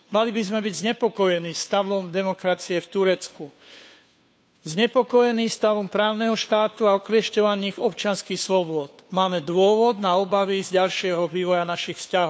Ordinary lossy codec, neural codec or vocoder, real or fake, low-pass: none; codec, 16 kHz, 2 kbps, FunCodec, trained on Chinese and English, 25 frames a second; fake; none